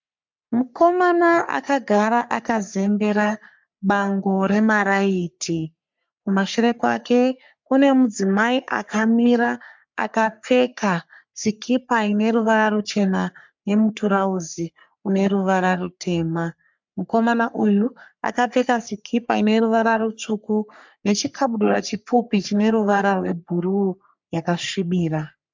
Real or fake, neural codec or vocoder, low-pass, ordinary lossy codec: fake; codec, 44.1 kHz, 3.4 kbps, Pupu-Codec; 7.2 kHz; MP3, 64 kbps